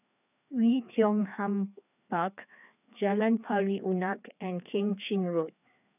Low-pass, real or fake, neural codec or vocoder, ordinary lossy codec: 3.6 kHz; fake; codec, 16 kHz, 2 kbps, FreqCodec, larger model; none